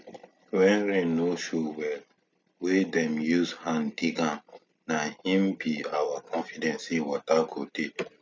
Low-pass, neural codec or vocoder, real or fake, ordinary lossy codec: none; none; real; none